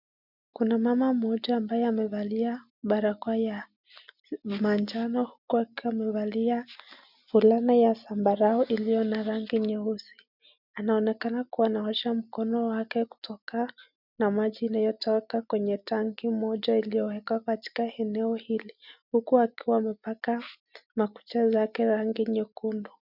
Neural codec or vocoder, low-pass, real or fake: none; 5.4 kHz; real